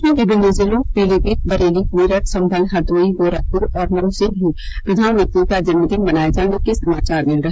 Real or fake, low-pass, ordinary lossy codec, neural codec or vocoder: fake; none; none; codec, 16 kHz, 16 kbps, FreqCodec, smaller model